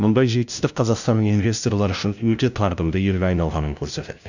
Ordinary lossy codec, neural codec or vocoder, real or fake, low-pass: none; codec, 16 kHz, 0.5 kbps, FunCodec, trained on LibriTTS, 25 frames a second; fake; 7.2 kHz